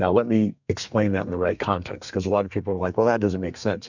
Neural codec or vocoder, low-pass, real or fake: codec, 32 kHz, 1.9 kbps, SNAC; 7.2 kHz; fake